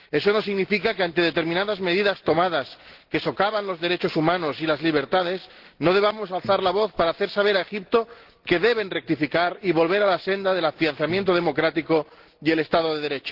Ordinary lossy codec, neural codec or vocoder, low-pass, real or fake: Opus, 16 kbps; none; 5.4 kHz; real